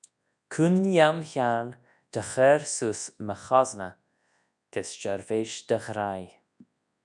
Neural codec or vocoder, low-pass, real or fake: codec, 24 kHz, 0.9 kbps, WavTokenizer, large speech release; 10.8 kHz; fake